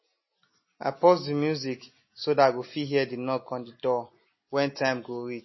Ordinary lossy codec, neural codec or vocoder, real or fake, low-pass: MP3, 24 kbps; none; real; 7.2 kHz